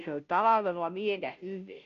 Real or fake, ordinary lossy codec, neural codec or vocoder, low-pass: fake; none; codec, 16 kHz, 0.5 kbps, FunCodec, trained on Chinese and English, 25 frames a second; 7.2 kHz